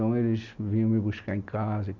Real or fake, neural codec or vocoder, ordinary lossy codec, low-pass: real; none; none; 7.2 kHz